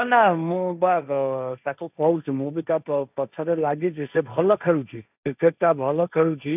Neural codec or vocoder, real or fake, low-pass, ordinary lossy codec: codec, 16 kHz, 1.1 kbps, Voila-Tokenizer; fake; 3.6 kHz; none